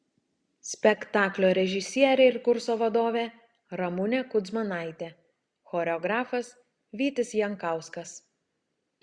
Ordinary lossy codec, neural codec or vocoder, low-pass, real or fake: Opus, 64 kbps; none; 9.9 kHz; real